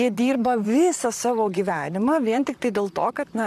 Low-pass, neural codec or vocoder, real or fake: 14.4 kHz; vocoder, 44.1 kHz, 128 mel bands, Pupu-Vocoder; fake